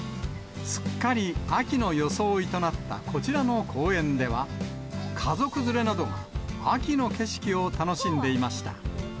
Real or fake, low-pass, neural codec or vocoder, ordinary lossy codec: real; none; none; none